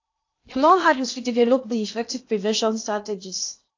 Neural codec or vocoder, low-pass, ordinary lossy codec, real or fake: codec, 16 kHz in and 24 kHz out, 0.8 kbps, FocalCodec, streaming, 65536 codes; 7.2 kHz; AAC, 48 kbps; fake